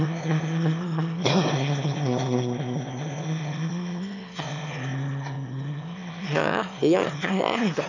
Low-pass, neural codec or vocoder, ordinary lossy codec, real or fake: 7.2 kHz; autoencoder, 22.05 kHz, a latent of 192 numbers a frame, VITS, trained on one speaker; none; fake